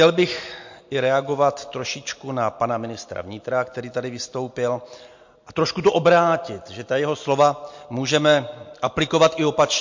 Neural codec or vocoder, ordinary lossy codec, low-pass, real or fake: none; MP3, 48 kbps; 7.2 kHz; real